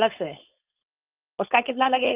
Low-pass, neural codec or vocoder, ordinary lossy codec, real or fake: 3.6 kHz; codec, 16 kHz, 4.8 kbps, FACodec; Opus, 24 kbps; fake